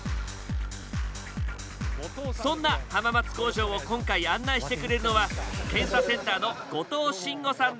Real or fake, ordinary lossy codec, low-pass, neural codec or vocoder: real; none; none; none